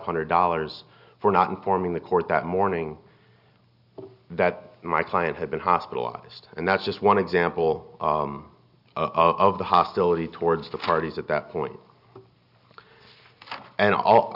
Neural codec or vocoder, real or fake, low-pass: none; real; 5.4 kHz